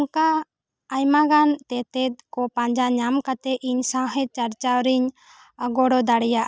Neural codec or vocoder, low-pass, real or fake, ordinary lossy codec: none; none; real; none